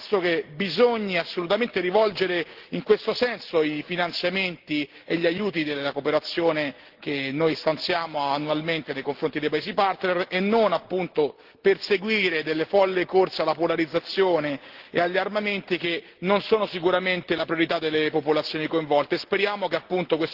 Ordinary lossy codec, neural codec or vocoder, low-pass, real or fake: Opus, 16 kbps; none; 5.4 kHz; real